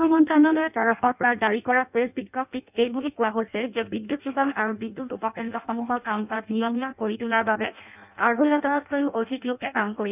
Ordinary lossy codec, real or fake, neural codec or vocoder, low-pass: none; fake; codec, 16 kHz in and 24 kHz out, 0.6 kbps, FireRedTTS-2 codec; 3.6 kHz